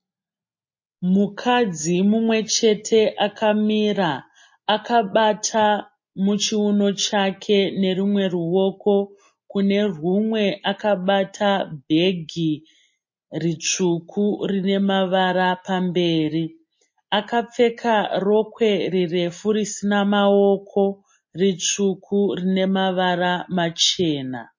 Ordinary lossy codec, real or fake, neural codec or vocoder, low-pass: MP3, 32 kbps; real; none; 7.2 kHz